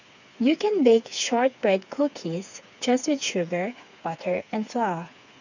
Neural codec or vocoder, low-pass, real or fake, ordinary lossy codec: codec, 16 kHz, 4 kbps, FreqCodec, smaller model; 7.2 kHz; fake; none